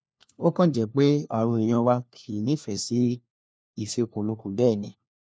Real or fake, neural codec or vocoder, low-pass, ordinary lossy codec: fake; codec, 16 kHz, 1 kbps, FunCodec, trained on LibriTTS, 50 frames a second; none; none